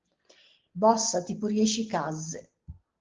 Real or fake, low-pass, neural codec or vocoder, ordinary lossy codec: real; 7.2 kHz; none; Opus, 16 kbps